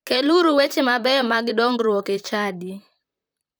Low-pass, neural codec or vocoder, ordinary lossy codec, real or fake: none; vocoder, 44.1 kHz, 128 mel bands, Pupu-Vocoder; none; fake